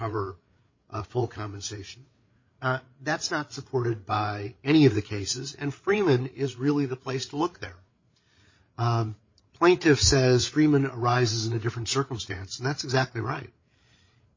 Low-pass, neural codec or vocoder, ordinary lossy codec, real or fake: 7.2 kHz; codec, 16 kHz, 8 kbps, FreqCodec, larger model; MP3, 32 kbps; fake